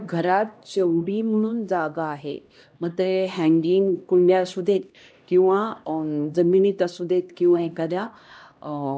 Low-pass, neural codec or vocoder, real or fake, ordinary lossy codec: none; codec, 16 kHz, 1 kbps, X-Codec, HuBERT features, trained on LibriSpeech; fake; none